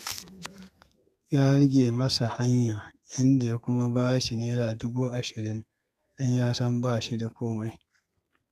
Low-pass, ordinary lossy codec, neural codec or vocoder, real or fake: 14.4 kHz; none; codec, 32 kHz, 1.9 kbps, SNAC; fake